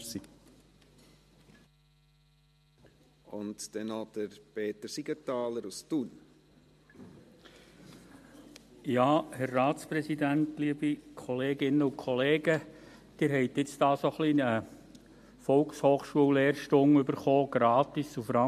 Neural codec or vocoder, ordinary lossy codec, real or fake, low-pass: none; MP3, 64 kbps; real; 14.4 kHz